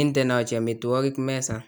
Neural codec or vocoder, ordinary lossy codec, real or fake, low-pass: none; none; real; none